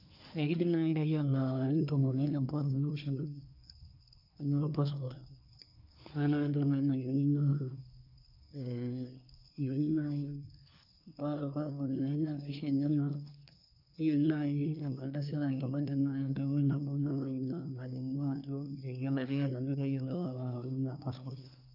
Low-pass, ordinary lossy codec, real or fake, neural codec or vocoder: 5.4 kHz; none; fake; codec, 24 kHz, 1 kbps, SNAC